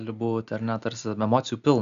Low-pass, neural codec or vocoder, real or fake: 7.2 kHz; none; real